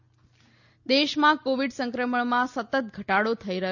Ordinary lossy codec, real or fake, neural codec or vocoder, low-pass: none; real; none; 7.2 kHz